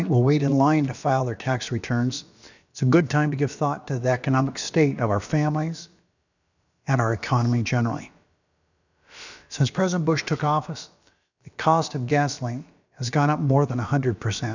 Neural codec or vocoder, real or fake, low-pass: codec, 16 kHz, about 1 kbps, DyCAST, with the encoder's durations; fake; 7.2 kHz